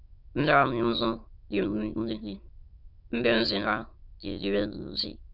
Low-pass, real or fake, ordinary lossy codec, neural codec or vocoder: 5.4 kHz; fake; Opus, 64 kbps; autoencoder, 22.05 kHz, a latent of 192 numbers a frame, VITS, trained on many speakers